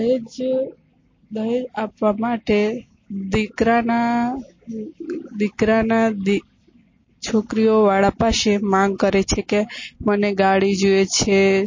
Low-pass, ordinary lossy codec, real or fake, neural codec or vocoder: 7.2 kHz; MP3, 32 kbps; real; none